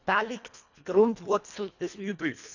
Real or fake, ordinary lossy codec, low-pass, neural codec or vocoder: fake; none; 7.2 kHz; codec, 24 kHz, 1.5 kbps, HILCodec